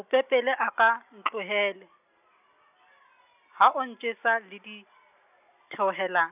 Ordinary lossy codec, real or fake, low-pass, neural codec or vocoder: none; real; 3.6 kHz; none